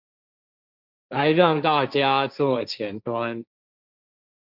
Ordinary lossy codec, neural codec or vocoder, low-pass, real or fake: Opus, 64 kbps; codec, 16 kHz, 1.1 kbps, Voila-Tokenizer; 5.4 kHz; fake